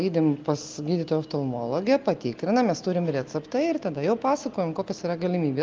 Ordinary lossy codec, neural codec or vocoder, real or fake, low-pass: Opus, 32 kbps; none; real; 7.2 kHz